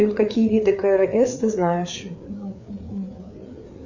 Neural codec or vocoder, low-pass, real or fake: codec, 16 kHz, 4 kbps, FreqCodec, larger model; 7.2 kHz; fake